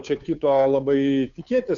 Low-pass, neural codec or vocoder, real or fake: 7.2 kHz; codec, 16 kHz, 2 kbps, FunCodec, trained on Chinese and English, 25 frames a second; fake